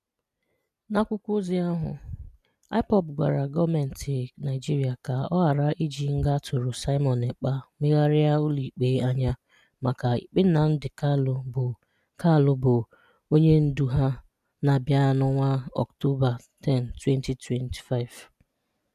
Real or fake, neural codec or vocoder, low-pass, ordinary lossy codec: real; none; 14.4 kHz; none